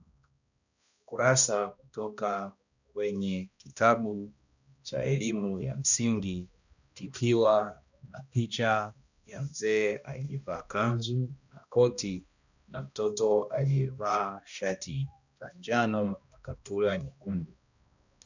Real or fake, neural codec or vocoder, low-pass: fake; codec, 16 kHz, 1 kbps, X-Codec, HuBERT features, trained on balanced general audio; 7.2 kHz